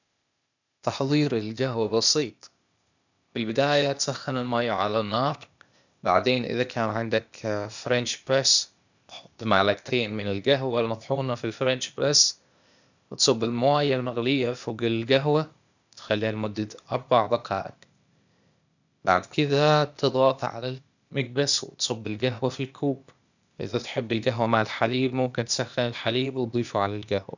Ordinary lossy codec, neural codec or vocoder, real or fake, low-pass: none; codec, 16 kHz, 0.8 kbps, ZipCodec; fake; 7.2 kHz